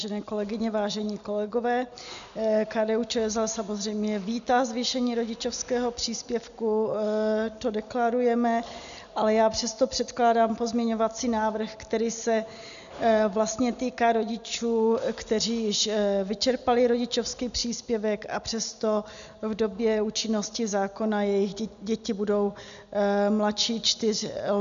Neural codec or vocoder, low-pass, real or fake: none; 7.2 kHz; real